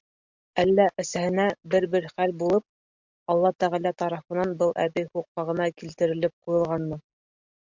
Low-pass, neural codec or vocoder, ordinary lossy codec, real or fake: 7.2 kHz; none; MP3, 64 kbps; real